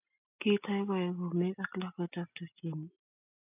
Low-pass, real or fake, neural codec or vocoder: 3.6 kHz; real; none